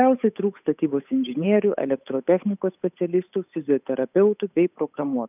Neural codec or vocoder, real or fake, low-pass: codec, 16 kHz, 8 kbps, FunCodec, trained on Chinese and English, 25 frames a second; fake; 3.6 kHz